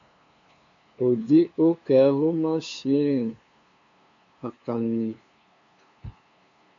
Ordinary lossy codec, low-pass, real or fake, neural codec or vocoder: MP3, 64 kbps; 7.2 kHz; fake; codec, 16 kHz, 2 kbps, FunCodec, trained on LibriTTS, 25 frames a second